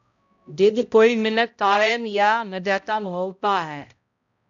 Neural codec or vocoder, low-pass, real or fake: codec, 16 kHz, 0.5 kbps, X-Codec, HuBERT features, trained on balanced general audio; 7.2 kHz; fake